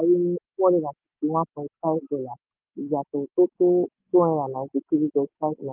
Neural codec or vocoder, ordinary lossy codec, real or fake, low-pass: none; Opus, 24 kbps; real; 3.6 kHz